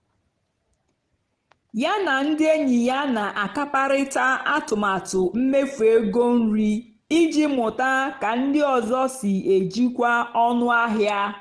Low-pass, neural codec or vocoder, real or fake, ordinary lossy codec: 9.9 kHz; none; real; Opus, 16 kbps